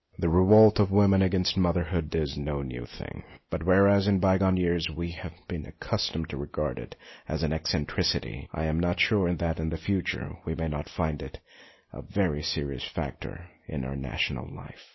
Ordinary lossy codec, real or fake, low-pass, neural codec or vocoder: MP3, 24 kbps; real; 7.2 kHz; none